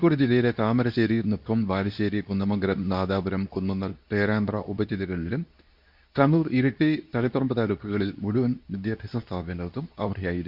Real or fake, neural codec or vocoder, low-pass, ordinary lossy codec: fake; codec, 24 kHz, 0.9 kbps, WavTokenizer, medium speech release version 2; 5.4 kHz; none